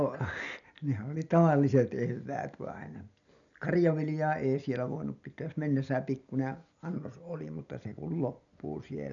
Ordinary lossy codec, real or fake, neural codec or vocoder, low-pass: none; real; none; 7.2 kHz